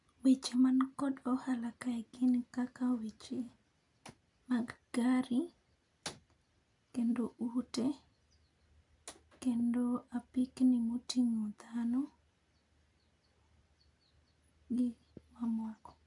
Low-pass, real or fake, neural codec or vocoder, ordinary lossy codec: 10.8 kHz; real; none; none